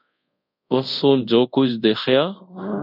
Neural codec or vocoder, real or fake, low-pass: codec, 24 kHz, 0.5 kbps, DualCodec; fake; 5.4 kHz